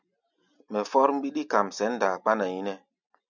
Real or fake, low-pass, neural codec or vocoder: real; 7.2 kHz; none